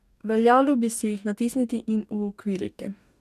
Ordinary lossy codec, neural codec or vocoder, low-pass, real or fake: none; codec, 44.1 kHz, 2.6 kbps, DAC; 14.4 kHz; fake